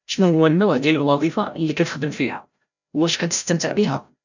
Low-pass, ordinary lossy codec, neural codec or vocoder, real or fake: 7.2 kHz; none; codec, 16 kHz, 0.5 kbps, FreqCodec, larger model; fake